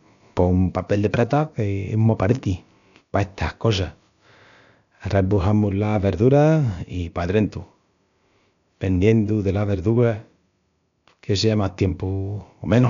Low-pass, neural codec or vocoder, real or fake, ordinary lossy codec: 7.2 kHz; codec, 16 kHz, about 1 kbps, DyCAST, with the encoder's durations; fake; none